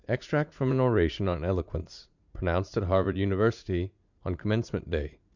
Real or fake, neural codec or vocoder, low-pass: fake; vocoder, 44.1 kHz, 80 mel bands, Vocos; 7.2 kHz